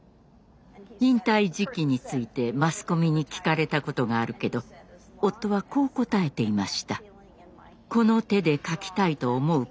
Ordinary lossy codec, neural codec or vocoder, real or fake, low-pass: none; none; real; none